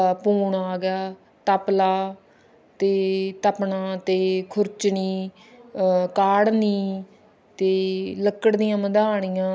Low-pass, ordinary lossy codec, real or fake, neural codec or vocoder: none; none; real; none